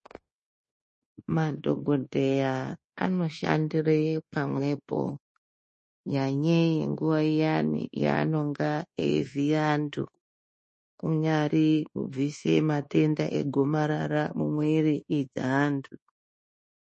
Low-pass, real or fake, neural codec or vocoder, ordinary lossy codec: 10.8 kHz; fake; codec, 24 kHz, 1.2 kbps, DualCodec; MP3, 32 kbps